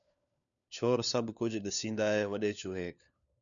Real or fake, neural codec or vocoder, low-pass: fake; codec, 16 kHz, 4 kbps, FunCodec, trained on LibriTTS, 50 frames a second; 7.2 kHz